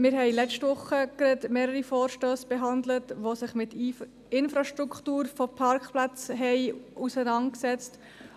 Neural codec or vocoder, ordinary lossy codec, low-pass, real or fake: none; none; 14.4 kHz; real